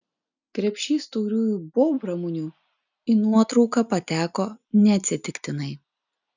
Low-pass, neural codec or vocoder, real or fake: 7.2 kHz; none; real